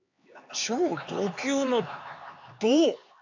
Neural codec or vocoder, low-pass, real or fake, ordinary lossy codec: codec, 16 kHz, 4 kbps, X-Codec, HuBERT features, trained on LibriSpeech; 7.2 kHz; fake; AAC, 48 kbps